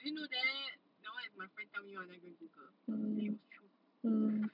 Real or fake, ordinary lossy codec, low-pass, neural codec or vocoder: real; none; 5.4 kHz; none